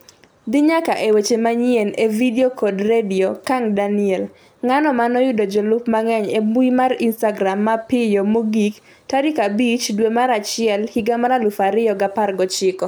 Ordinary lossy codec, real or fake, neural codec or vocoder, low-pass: none; real; none; none